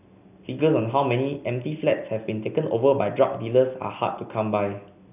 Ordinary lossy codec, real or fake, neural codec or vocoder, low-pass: none; real; none; 3.6 kHz